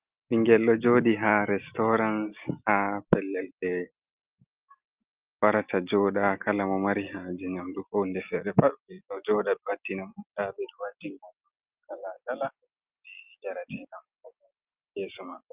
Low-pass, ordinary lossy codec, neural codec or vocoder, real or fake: 3.6 kHz; Opus, 32 kbps; none; real